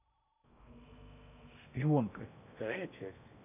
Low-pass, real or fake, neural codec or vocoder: 3.6 kHz; fake; codec, 16 kHz in and 24 kHz out, 0.8 kbps, FocalCodec, streaming, 65536 codes